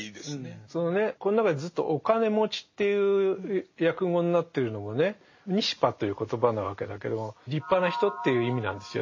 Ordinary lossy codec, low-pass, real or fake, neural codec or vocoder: MP3, 32 kbps; 7.2 kHz; real; none